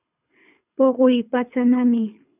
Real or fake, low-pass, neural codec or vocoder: fake; 3.6 kHz; codec, 24 kHz, 3 kbps, HILCodec